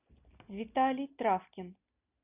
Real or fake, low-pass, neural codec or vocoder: real; 3.6 kHz; none